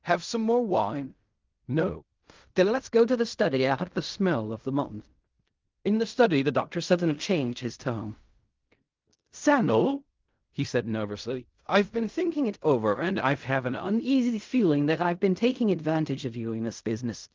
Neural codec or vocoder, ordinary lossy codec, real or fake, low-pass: codec, 16 kHz in and 24 kHz out, 0.4 kbps, LongCat-Audio-Codec, fine tuned four codebook decoder; Opus, 32 kbps; fake; 7.2 kHz